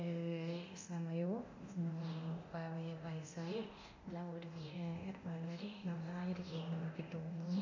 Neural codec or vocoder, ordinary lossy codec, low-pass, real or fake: codec, 24 kHz, 0.9 kbps, DualCodec; AAC, 48 kbps; 7.2 kHz; fake